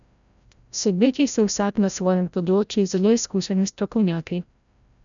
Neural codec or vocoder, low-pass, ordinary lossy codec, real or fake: codec, 16 kHz, 0.5 kbps, FreqCodec, larger model; 7.2 kHz; none; fake